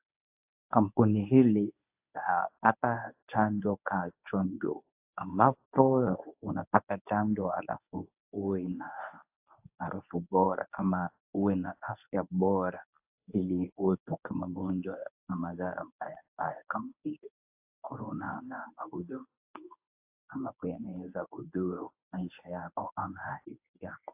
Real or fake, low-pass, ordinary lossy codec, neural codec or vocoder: fake; 3.6 kHz; AAC, 32 kbps; codec, 24 kHz, 0.9 kbps, WavTokenizer, medium speech release version 1